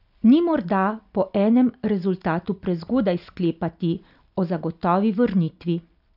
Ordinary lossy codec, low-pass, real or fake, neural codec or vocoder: none; 5.4 kHz; real; none